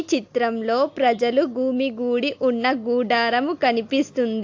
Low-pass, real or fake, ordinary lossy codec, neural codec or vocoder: 7.2 kHz; real; AAC, 48 kbps; none